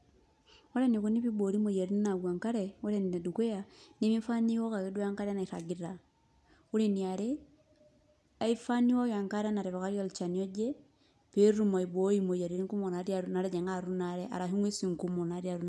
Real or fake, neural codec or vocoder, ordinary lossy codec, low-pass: real; none; none; none